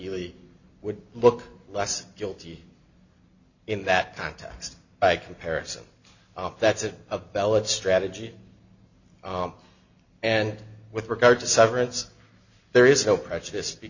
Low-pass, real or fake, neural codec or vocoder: 7.2 kHz; real; none